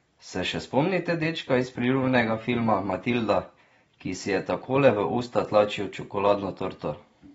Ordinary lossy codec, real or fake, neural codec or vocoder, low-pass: AAC, 24 kbps; real; none; 19.8 kHz